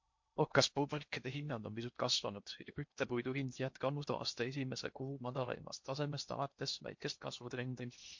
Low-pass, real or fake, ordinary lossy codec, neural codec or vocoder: 7.2 kHz; fake; MP3, 48 kbps; codec, 16 kHz in and 24 kHz out, 0.8 kbps, FocalCodec, streaming, 65536 codes